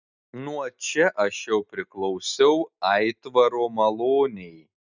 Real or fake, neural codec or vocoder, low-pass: real; none; 7.2 kHz